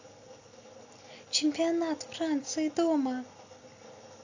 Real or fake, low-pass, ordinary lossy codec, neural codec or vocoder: real; 7.2 kHz; AAC, 48 kbps; none